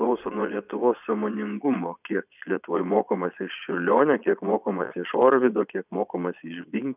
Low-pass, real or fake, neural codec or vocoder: 3.6 kHz; fake; vocoder, 22.05 kHz, 80 mel bands, Vocos